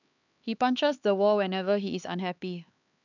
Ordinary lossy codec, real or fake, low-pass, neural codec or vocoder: none; fake; 7.2 kHz; codec, 16 kHz, 4 kbps, X-Codec, HuBERT features, trained on LibriSpeech